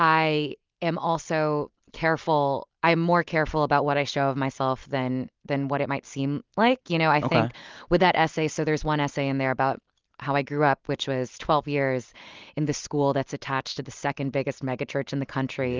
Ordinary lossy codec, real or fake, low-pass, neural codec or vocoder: Opus, 24 kbps; real; 7.2 kHz; none